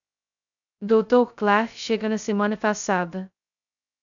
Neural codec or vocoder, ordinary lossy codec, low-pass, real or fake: codec, 16 kHz, 0.2 kbps, FocalCodec; MP3, 96 kbps; 7.2 kHz; fake